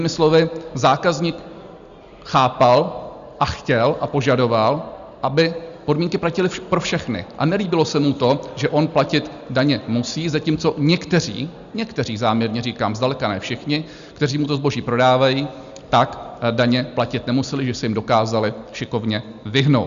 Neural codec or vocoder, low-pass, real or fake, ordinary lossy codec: none; 7.2 kHz; real; Opus, 64 kbps